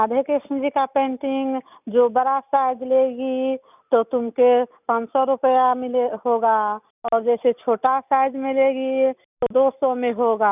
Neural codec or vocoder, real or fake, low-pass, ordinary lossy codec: none; real; 3.6 kHz; none